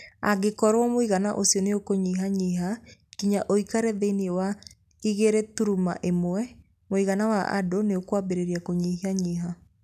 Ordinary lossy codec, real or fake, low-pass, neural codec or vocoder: none; real; 14.4 kHz; none